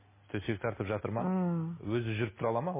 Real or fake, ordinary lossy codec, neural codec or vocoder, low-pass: real; MP3, 16 kbps; none; 3.6 kHz